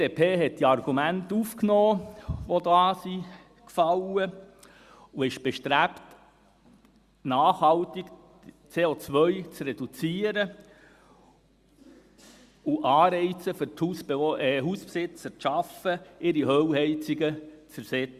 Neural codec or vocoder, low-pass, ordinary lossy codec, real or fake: none; 14.4 kHz; Opus, 64 kbps; real